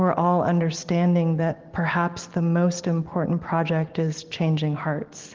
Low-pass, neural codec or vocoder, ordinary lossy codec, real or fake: 7.2 kHz; none; Opus, 16 kbps; real